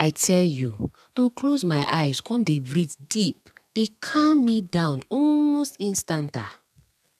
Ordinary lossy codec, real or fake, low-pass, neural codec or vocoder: none; fake; 14.4 kHz; codec, 32 kHz, 1.9 kbps, SNAC